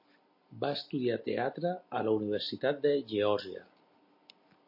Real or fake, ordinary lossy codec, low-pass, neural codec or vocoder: real; MP3, 32 kbps; 5.4 kHz; none